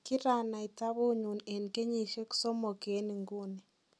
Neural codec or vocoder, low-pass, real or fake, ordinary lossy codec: none; none; real; none